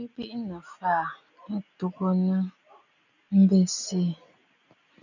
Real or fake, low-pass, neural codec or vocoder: real; 7.2 kHz; none